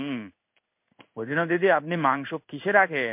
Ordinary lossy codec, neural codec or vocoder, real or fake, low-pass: MP3, 32 kbps; codec, 16 kHz in and 24 kHz out, 1 kbps, XY-Tokenizer; fake; 3.6 kHz